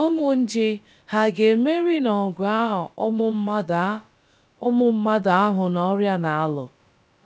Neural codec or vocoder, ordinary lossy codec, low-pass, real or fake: codec, 16 kHz, about 1 kbps, DyCAST, with the encoder's durations; none; none; fake